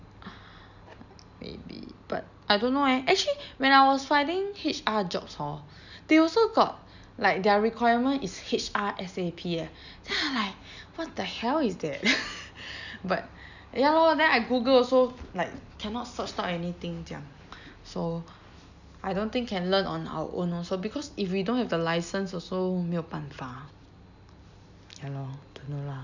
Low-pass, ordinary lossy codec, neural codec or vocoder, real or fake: 7.2 kHz; none; none; real